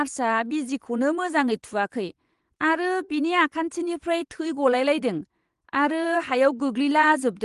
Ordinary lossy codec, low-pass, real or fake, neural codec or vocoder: Opus, 24 kbps; 10.8 kHz; fake; vocoder, 24 kHz, 100 mel bands, Vocos